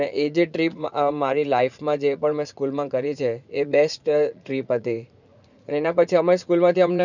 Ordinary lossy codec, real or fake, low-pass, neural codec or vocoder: none; fake; 7.2 kHz; vocoder, 44.1 kHz, 80 mel bands, Vocos